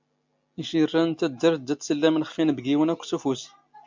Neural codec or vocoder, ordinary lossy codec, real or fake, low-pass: none; MP3, 64 kbps; real; 7.2 kHz